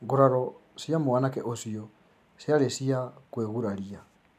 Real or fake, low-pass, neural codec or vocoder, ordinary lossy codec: real; 14.4 kHz; none; MP3, 96 kbps